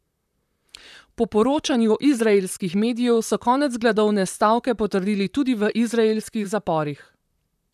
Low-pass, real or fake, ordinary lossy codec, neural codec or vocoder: 14.4 kHz; fake; none; vocoder, 44.1 kHz, 128 mel bands, Pupu-Vocoder